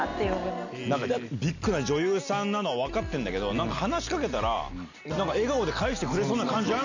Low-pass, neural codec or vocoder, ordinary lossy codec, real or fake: 7.2 kHz; none; none; real